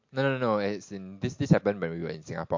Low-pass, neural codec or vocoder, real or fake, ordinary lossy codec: 7.2 kHz; none; real; MP3, 48 kbps